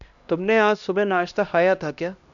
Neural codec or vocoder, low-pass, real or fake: codec, 16 kHz, 1 kbps, X-Codec, HuBERT features, trained on LibriSpeech; 7.2 kHz; fake